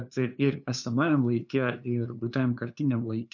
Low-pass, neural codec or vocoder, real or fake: 7.2 kHz; codec, 16 kHz, 2 kbps, FunCodec, trained on LibriTTS, 25 frames a second; fake